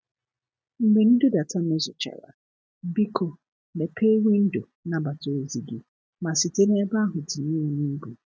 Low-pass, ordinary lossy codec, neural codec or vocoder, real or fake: none; none; none; real